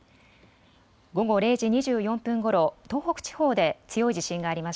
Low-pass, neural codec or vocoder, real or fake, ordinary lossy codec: none; none; real; none